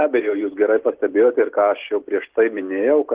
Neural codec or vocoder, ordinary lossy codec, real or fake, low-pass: none; Opus, 16 kbps; real; 3.6 kHz